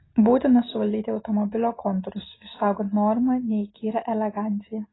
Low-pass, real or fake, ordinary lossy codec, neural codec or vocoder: 7.2 kHz; real; AAC, 16 kbps; none